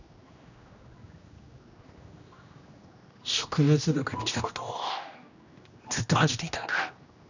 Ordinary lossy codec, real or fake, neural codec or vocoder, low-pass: none; fake; codec, 16 kHz, 1 kbps, X-Codec, HuBERT features, trained on general audio; 7.2 kHz